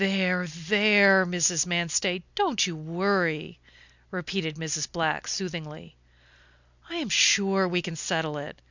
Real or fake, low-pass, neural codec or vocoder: real; 7.2 kHz; none